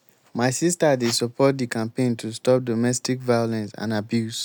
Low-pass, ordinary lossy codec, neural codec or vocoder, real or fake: none; none; none; real